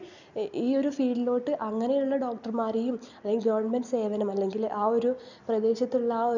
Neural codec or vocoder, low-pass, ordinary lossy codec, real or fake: none; 7.2 kHz; none; real